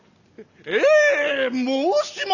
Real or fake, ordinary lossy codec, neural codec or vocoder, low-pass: real; none; none; 7.2 kHz